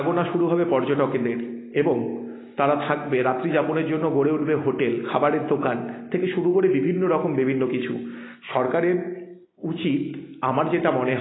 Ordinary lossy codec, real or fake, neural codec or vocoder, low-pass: AAC, 16 kbps; real; none; 7.2 kHz